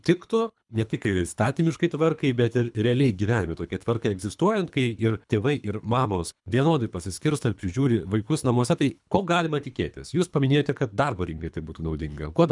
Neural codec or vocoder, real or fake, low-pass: codec, 24 kHz, 3 kbps, HILCodec; fake; 10.8 kHz